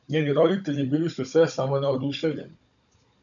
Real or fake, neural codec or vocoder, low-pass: fake; codec, 16 kHz, 16 kbps, FunCodec, trained on Chinese and English, 50 frames a second; 7.2 kHz